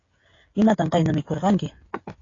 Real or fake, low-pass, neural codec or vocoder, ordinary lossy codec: fake; 7.2 kHz; codec, 16 kHz, 16 kbps, FreqCodec, smaller model; AAC, 32 kbps